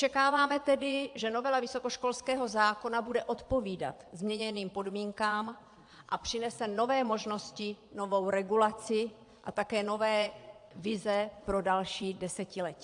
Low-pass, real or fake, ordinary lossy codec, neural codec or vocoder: 9.9 kHz; fake; AAC, 64 kbps; vocoder, 22.05 kHz, 80 mel bands, Vocos